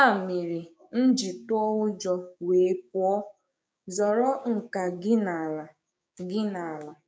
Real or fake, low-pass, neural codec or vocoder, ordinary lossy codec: fake; none; codec, 16 kHz, 6 kbps, DAC; none